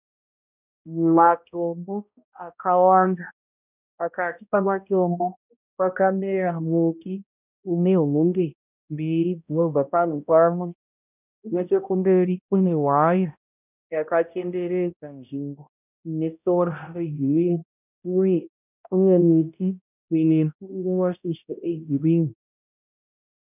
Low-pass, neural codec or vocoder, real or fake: 3.6 kHz; codec, 16 kHz, 0.5 kbps, X-Codec, HuBERT features, trained on balanced general audio; fake